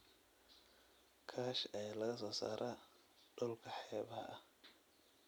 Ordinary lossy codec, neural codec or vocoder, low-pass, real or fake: none; none; none; real